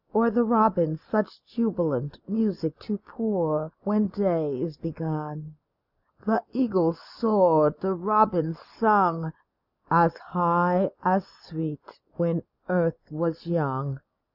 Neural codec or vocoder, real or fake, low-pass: none; real; 5.4 kHz